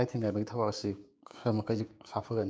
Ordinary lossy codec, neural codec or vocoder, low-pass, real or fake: none; codec, 16 kHz, 6 kbps, DAC; none; fake